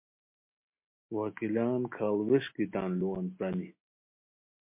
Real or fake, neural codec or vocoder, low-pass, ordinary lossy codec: real; none; 3.6 kHz; MP3, 24 kbps